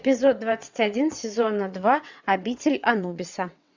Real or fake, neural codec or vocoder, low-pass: fake; vocoder, 22.05 kHz, 80 mel bands, WaveNeXt; 7.2 kHz